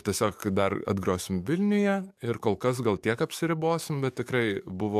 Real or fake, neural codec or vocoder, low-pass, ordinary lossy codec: fake; codec, 44.1 kHz, 7.8 kbps, DAC; 14.4 kHz; MP3, 96 kbps